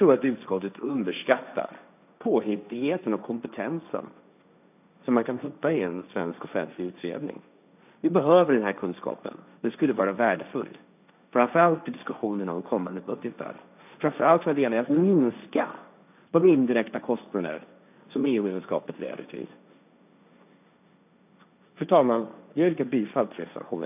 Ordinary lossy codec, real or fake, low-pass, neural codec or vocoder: none; fake; 3.6 kHz; codec, 16 kHz, 1.1 kbps, Voila-Tokenizer